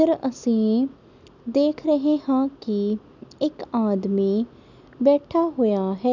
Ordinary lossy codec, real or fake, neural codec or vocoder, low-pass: none; real; none; 7.2 kHz